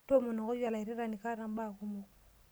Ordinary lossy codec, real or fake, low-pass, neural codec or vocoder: none; real; none; none